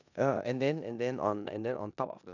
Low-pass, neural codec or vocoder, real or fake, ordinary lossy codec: 7.2 kHz; codec, 16 kHz in and 24 kHz out, 0.9 kbps, LongCat-Audio-Codec, four codebook decoder; fake; none